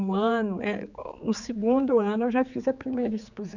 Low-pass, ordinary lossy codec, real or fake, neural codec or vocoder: 7.2 kHz; none; fake; codec, 16 kHz, 4 kbps, X-Codec, HuBERT features, trained on general audio